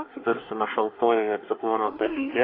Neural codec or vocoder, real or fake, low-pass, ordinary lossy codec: codec, 24 kHz, 1 kbps, SNAC; fake; 5.4 kHz; AAC, 24 kbps